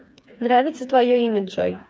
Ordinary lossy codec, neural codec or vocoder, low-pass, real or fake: none; codec, 16 kHz, 4 kbps, FreqCodec, smaller model; none; fake